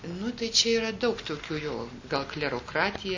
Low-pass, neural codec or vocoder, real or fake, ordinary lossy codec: 7.2 kHz; none; real; MP3, 64 kbps